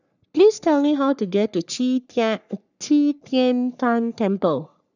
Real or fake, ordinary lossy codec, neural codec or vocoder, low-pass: fake; none; codec, 44.1 kHz, 3.4 kbps, Pupu-Codec; 7.2 kHz